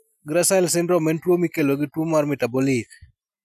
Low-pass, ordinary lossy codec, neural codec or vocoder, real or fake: 14.4 kHz; none; none; real